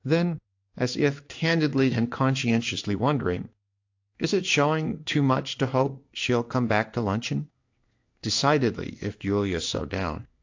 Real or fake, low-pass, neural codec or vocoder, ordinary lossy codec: fake; 7.2 kHz; codec, 16 kHz in and 24 kHz out, 1 kbps, XY-Tokenizer; AAC, 48 kbps